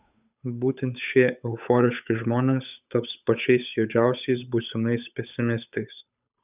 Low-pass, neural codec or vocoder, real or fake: 3.6 kHz; codec, 16 kHz, 8 kbps, FunCodec, trained on Chinese and English, 25 frames a second; fake